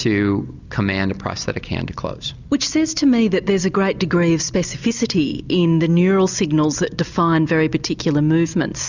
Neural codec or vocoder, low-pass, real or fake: none; 7.2 kHz; real